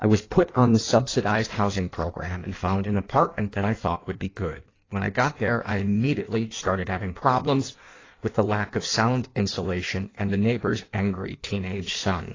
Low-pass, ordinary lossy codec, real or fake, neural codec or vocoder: 7.2 kHz; AAC, 32 kbps; fake; codec, 16 kHz in and 24 kHz out, 1.1 kbps, FireRedTTS-2 codec